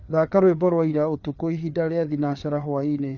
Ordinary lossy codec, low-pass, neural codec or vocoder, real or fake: none; 7.2 kHz; codec, 16 kHz, 4 kbps, FreqCodec, larger model; fake